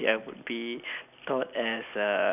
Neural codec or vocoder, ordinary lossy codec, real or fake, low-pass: none; none; real; 3.6 kHz